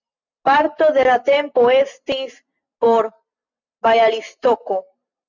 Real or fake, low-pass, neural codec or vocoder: real; 7.2 kHz; none